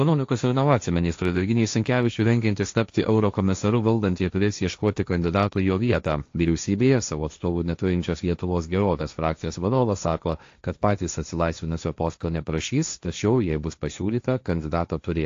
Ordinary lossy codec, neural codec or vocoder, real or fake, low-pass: AAC, 48 kbps; codec, 16 kHz, 1.1 kbps, Voila-Tokenizer; fake; 7.2 kHz